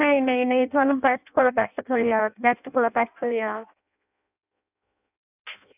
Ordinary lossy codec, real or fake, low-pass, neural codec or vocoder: none; fake; 3.6 kHz; codec, 16 kHz in and 24 kHz out, 0.6 kbps, FireRedTTS-2 codec